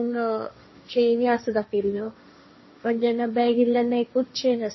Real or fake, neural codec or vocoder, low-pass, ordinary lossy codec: fake; codec, 16 kHz, 1.1 kbps, Voila-Tokenizer; 7.2 kHz; MP3, 24 kbps